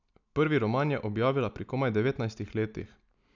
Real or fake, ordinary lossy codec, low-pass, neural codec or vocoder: real; none; 7.2 kHz; none